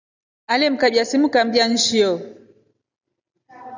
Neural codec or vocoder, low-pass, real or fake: none; 7.2 kHz; real